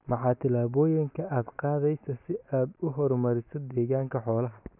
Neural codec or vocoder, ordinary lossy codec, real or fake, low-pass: none; none; real; 3.6 kHz